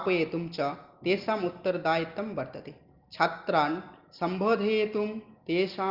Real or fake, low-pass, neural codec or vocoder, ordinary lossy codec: real; 5.4 kHz; none; Opus, 32 kbps